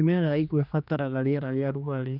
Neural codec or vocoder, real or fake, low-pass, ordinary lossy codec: codec, 16 kHz, 2 kbps, X-Codec, HuBERT features, trained on general audio; fake; 5.4 kHz; none